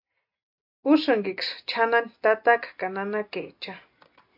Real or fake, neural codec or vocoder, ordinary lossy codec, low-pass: real; none; MP3, 48 kbps; 5.4 kHz